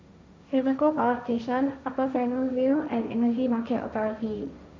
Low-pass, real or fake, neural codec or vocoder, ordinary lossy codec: none; fake; codec, 16 kHz, 1.1 kbps, Voila-Tokenizer; none